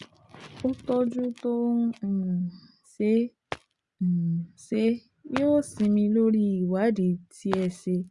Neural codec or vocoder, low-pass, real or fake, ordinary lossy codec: none; 10.8 kHz; real; Opus, 64 kbps